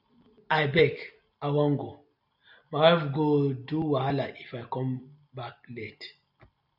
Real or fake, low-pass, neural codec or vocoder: real; 5.4 kHz; none